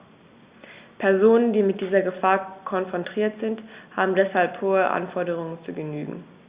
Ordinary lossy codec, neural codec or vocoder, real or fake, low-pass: Opus, 64 kbps; none; real; 3.6 kHz